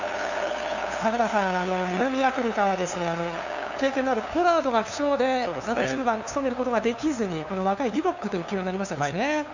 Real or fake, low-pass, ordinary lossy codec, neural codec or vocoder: fake; 7.2 kHz; none; codec, 16 kHz, 2 kbps, FunCodec, trained on LibriTTS, 25 frames a second